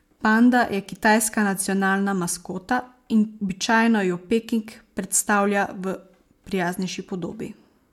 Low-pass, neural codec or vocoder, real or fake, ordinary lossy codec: 19.8 kHz; none; real; MP3, 96 kbps